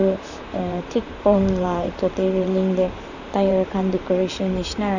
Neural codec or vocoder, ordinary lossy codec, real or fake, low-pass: vocoder, 44.1 kHz, 128 mel bands, Pupu-Vocoder; none; fake; 7.2 kHz